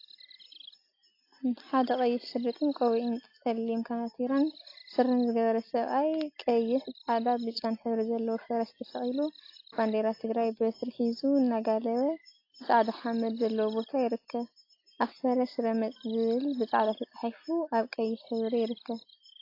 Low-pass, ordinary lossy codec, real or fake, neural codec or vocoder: 5.4 kHz; AAC, 32 kbps; real; none